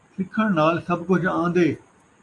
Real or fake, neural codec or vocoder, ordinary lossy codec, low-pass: real; none; AAC, 48 kbps; 10.8 kHz